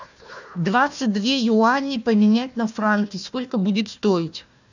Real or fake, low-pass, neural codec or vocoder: fake; 7.2 kHz; codec, 16 kHz, 1 kbps, FunCodec, trained on Chinese and English, 50 frames a second